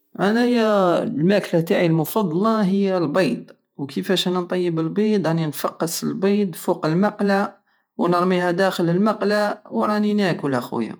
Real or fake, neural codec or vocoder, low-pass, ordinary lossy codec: fake; vocoder, 48 kHz, 128 mel bands, Vocos; none; none